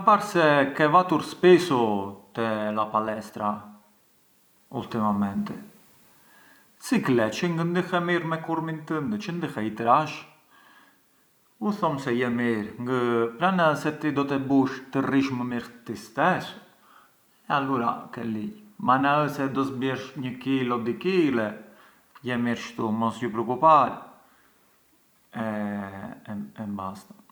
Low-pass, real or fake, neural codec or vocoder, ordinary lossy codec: none; real; none; none